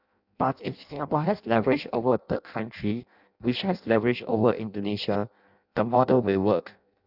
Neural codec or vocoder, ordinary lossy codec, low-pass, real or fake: codec, 16 kHz in and 24 kHz out, 0.6 kbps, FireRedTTS-2 codec; none; 5.4 kHz; fake